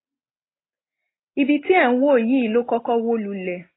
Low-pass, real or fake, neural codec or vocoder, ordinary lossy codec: 7.2 kHz; real; none; AAC, 16 kbps